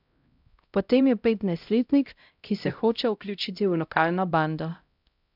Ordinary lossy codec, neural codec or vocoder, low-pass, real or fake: none; codec, 16 kHz, 0.5 kbps, X-Codec, HuBERT features, trained on LibriSpeech; 5.4 kHz; fake